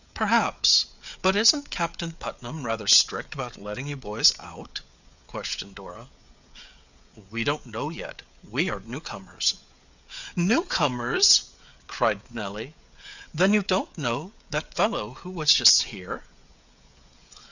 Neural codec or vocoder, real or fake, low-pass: codec, 16 kHz, 16 kbps, FreqCodec, smaller model; fake; 7.2 kHz